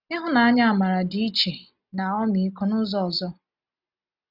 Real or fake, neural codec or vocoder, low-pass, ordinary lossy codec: real; none; 5.4 kHz; none